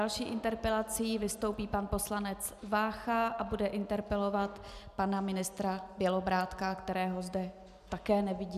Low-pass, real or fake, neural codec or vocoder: 14.4 kHz; real; none